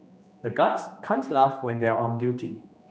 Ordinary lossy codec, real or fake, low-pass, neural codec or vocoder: none; fake; none; codec, 16 kHz, 2 kbps, X-Codec, HuBERT features, trained on general audio